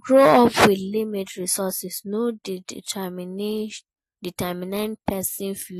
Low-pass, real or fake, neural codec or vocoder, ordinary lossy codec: 14.4 kHz; real; none; AAC, 48 kbps